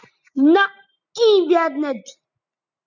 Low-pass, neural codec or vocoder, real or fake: 7.2 kHz; none; real